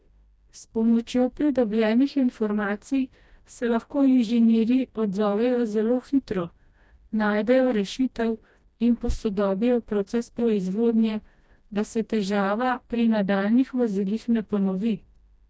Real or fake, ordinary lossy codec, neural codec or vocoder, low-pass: fake; none; codec, 16 kHz, 1 kbps, FreqCodec, smaller model; none